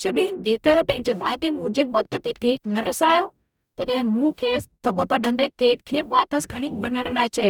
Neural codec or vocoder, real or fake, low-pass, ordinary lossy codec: codec, 44.1 kHz, 0.9 kbps, DAC; fake; 19.8 kHz; none